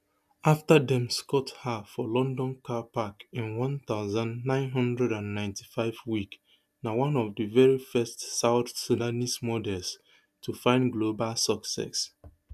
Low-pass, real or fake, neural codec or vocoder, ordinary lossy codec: 14.4 kHz; real; none; none